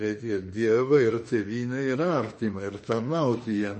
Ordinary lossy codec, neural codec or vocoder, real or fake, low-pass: MP3, 32 kbps; autoencoder, 48 kHz, 32 numbers a frame, DAC-VAE, trained on Japanese speech; fake; 10.8 kHz